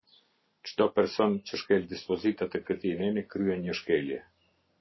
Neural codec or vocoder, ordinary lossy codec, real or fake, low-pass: none; MP3, 24 kbps; real; 7.2 kHz